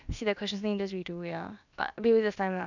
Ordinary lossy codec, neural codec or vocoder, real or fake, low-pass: none; codec, 16 kHz, about 1 kbps, DyCAST, with the encoder's durations; fake; 7.2 kHz